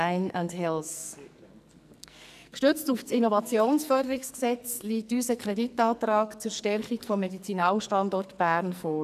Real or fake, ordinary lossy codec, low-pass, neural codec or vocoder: fake; none; 14.4 kHz; codec, 44.1 kHz, 2.6 kbps, SNAC